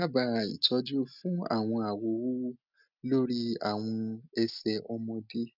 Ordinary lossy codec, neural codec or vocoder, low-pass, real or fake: none; none; 5.4 kHz; real